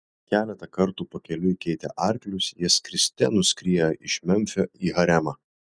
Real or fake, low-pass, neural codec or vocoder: real; 9.9 kHz; none